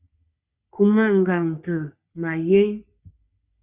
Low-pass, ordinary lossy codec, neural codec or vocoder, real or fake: 3.6 kHz; Opus, 64 kbps; codec, 44.1 kHz, 3.4 kbps, Pupu-Codec; fake